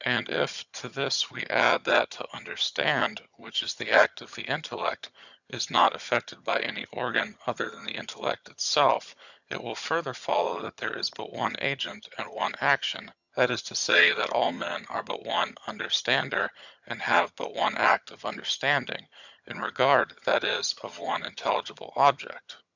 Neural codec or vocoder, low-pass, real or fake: vocoder, 22.05 kHz, 80 mel bands, HiFi-GAN; 7.2 kHz; fake